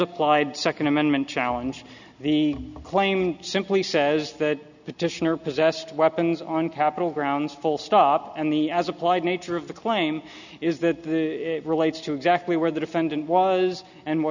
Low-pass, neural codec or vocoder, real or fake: 7.2 kHz; none; real